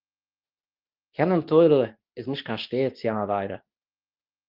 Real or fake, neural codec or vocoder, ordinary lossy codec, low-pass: fake; codec, 24 kHz, 0.9 kbps, WavTokenizer, medium speech release version 2; Opus, 32 kbps; 5.4 kHz